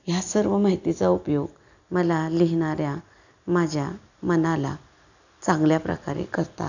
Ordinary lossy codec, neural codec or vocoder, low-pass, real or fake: none; none; 7.2 kHz; real